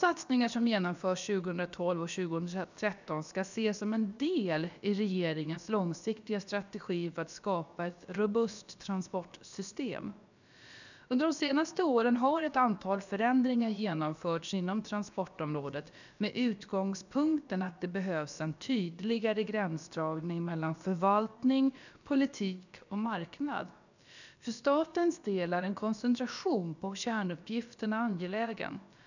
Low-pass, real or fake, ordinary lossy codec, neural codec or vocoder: 7.2 kHz; fake; none; codec, 16 kHz, about 1 kbps, DyCAST, with the encoder's durations